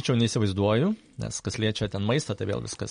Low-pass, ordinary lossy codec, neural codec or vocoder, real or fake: 9.9 kHz; MP3, 48 kbps; none; real